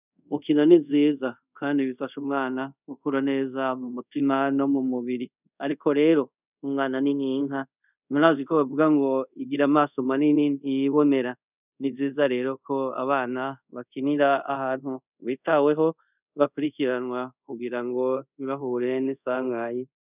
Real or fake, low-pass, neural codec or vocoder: fake; 3.6 kHz; codec, 24 kHz, 0.5 kbps, DualCodec